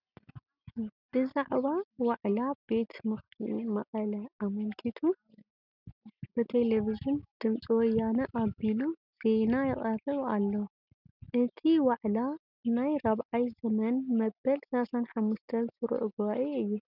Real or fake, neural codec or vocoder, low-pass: real; none; 5.4 kHz